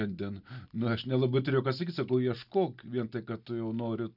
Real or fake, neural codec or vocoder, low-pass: real; none; 5.4 kHz